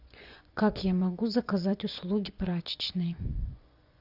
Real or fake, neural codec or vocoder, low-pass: fake; vocoder, 24 kHz, 100 mel bands, Vocos; 5.4 kHz